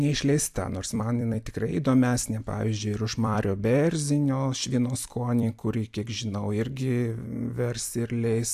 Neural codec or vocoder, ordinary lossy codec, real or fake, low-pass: vocoder, 44.1 kHz, 128 mel bands every 256 samples, BigVGAN v2; AAC, 96 kbps; fake; 14.4 kHz